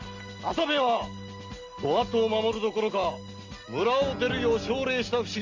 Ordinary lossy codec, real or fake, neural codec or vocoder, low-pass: Opus, 32 kbps; real; none; 7.2 kHz